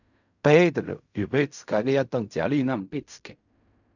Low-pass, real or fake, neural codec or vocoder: 7.2 kHz; fake; codec, 16 kHz in and 24 kHz out, 0.4 kbps, LongCat-Audio-Codec, fine tuned four codebook decoder